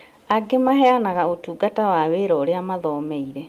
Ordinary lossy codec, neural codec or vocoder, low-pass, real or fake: Opus, 32 kbps; none; 14.4 kHz; real